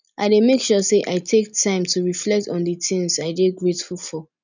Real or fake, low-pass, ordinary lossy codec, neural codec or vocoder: real; 7.2 kHz; none; none